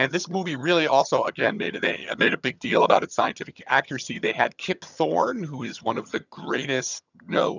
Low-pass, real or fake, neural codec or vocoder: 7.2 kHz; fake; vocoder, 22.05 kHz, 80 mel bands, HiFi-GAN